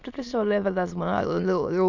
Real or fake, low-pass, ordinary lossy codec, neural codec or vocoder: fake; 7.2 kHz; none; autoencoder, 22.05 kHz, a latent of 192 numbers a frame, VITS, trained on many speakers